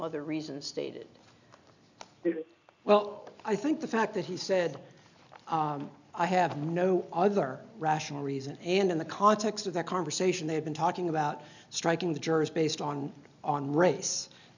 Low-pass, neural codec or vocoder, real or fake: 7.2 kHz; none; real